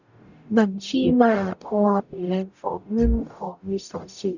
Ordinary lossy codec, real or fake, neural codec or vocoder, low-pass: none; fake; codec, 44.1 kHz, 0.9 kbps, DAC; 7.2 kHz